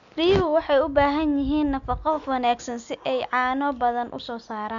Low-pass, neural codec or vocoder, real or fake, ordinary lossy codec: 7.2 kHz; none; real; none